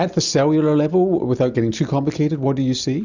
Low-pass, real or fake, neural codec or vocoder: 7.2 kHz; real; none